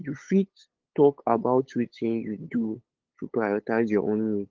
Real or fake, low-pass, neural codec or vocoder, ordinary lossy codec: fake; 7.2 kHz; codec, 16 kHz, 8 kbps, FunCodec, trained on LibriTTS, 25 frames a second; Opus, 16 kbps